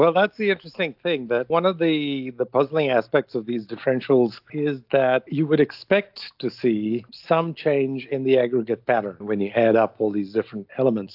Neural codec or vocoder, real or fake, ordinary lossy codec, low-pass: none; real; AAC, 48 kbps; 5.4 kHz